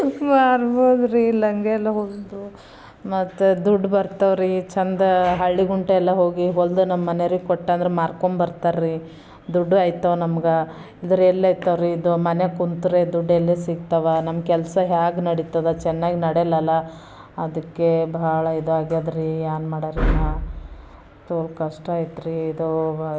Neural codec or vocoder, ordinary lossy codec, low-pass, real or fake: none; none; none; real